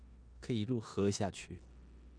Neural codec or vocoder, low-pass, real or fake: codec, 16 kHz in and 24 kHz out, 0.9 kbps, LongCat-Audio-Codec, four codebook decoder; 9.9 kHz; fake